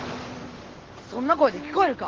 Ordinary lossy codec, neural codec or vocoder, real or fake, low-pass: Opus, 16 kbps; none; real; 7.2 kHz